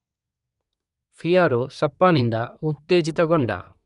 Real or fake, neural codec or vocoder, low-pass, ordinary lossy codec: fake; codec, 24 kHz, 1 kbps, SNAC; 10.8 kHz; none